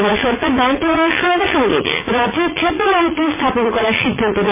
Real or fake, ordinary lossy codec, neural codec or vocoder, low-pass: fake; MP3, 16 kbps; vocoder, 44.1 kHz, 80 mel bands, Vocos; 3.6 kHz